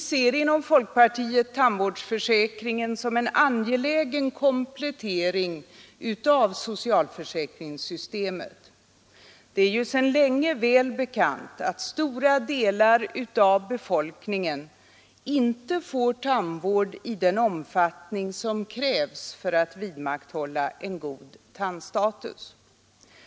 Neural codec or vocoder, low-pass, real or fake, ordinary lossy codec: none; none; real; none